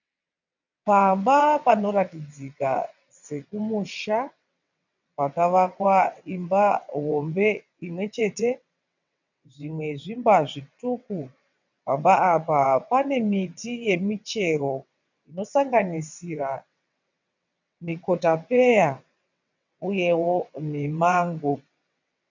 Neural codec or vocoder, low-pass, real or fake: vocoder, 22.05 kHz, 80 mel bands, WaveNeXt; 7.2 kHz; fake